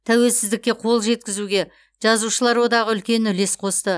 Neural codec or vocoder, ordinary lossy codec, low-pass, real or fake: none; none; none; real